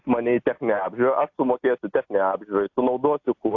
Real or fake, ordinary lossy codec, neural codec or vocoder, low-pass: real; AAC, 48 kbps; none; 7.2 kHz